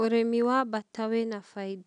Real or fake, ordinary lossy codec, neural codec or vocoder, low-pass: real; none; none; 9.9 kHz